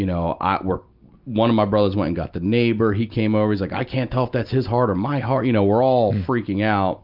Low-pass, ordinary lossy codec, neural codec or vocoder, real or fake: 5.4 kHz; Opus, 24 kbps; none; real